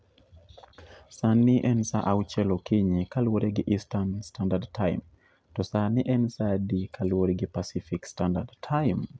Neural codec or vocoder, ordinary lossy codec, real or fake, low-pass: none; none; real; none